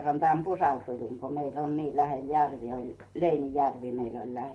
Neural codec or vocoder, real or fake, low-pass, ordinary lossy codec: codec, 24 kHz, 6 kbps, HILCodec; fake; none; none